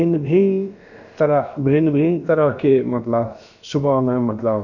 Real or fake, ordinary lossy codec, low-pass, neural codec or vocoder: fake; none; 7.2 kHz; codec, 16 kHz, about 1 kbps, DyCAST, with the encoder's durations